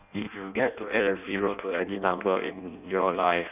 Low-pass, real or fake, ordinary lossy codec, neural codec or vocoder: 3.6 kHz; fake; none; codec, 16 kHz in and 24 kHz out, 0.6 kbps, FireRedTTS-2 codec